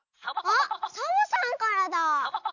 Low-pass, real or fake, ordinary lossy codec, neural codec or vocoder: 7.2 kHz; real; none; none